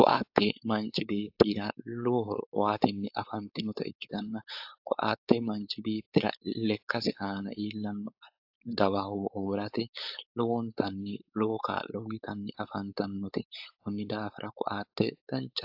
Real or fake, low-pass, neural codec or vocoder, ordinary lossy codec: fake; 5.4 kHz; codec, 16 kHz, 4.8 kbps, FACodec; AAC, 48 kbps